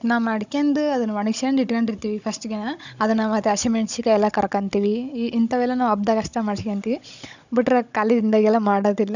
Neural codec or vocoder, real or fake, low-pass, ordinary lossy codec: codec, 16 kHz, 16 kbps, FunCodec, trained on Chinese and English, 50 frames a second; fake; 7.2 kHz; none